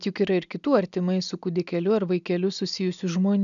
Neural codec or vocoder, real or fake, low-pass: none; real; 7.2 kHz